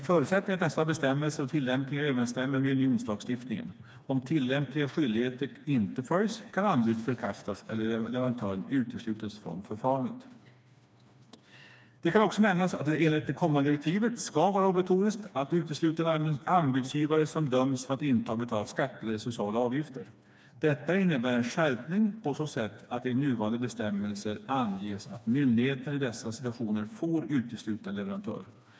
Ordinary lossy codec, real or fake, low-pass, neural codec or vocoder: none; fake; none; codec, 16 kHz, 2 kbps, FreqCodec, smaller model